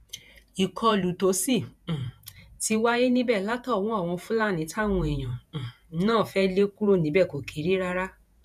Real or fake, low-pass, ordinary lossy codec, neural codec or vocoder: fake; 14.4 kHz; none; vocoder, 48 kHz, 128 mel bands, Vocos